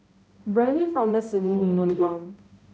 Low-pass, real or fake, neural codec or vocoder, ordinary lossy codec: none; fake; codec, 16 kHz, 0.5 kbps, X-Codec, HuBERT features, trained on balanced general audio; none